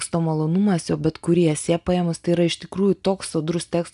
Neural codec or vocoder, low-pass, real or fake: none; 10.8 kHz; real